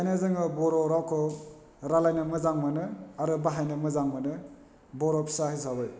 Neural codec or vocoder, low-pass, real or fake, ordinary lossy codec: none; none; real; none